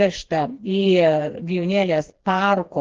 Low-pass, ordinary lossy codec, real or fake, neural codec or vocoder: 7.2 kHz; Opus, 16 kbps; fake; codec, 16 kHz, 2 kbps, FreqCodec, smaller model